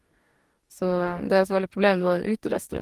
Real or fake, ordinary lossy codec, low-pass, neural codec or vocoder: fake; Opus, 24 kbps; 14.4 kHz; codec, 44.1 kHz, 2.6 kbps, DAC